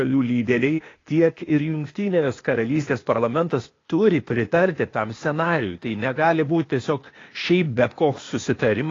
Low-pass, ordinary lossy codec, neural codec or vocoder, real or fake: 7.2 kHz; AAC, 32 kbps; codec, 16 kHz, 0.8 kbps, ZipCodec; fake